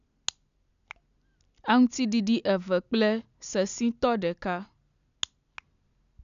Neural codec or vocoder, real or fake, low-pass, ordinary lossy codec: none; real; 7.2 kHz; none